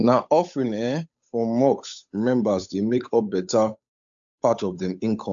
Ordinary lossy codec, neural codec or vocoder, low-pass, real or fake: AAC, 64 kbps; codec, 16 kHz, 8 kbps, FunCodec, trained on Chinese and English, 25 frames a second; 7.2 kHz; fake